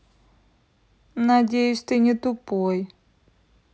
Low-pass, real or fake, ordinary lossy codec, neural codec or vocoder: none; real; none; none